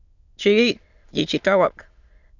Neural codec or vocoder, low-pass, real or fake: autoencoder, 22.05 kHz, a latent of 192 numbers a frame, VITS, trained on many speakers; 7.2 kHz; fake